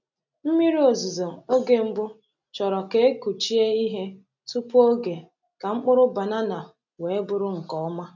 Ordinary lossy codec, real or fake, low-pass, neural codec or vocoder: none; real; 7.2 kHz; none